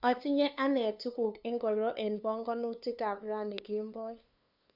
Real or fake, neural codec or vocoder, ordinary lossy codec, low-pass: fake; codec, 16 kHz, 2 kbps, FunCodec, trained on LibriTTS, 25 frames a second; none; 5.4 kHz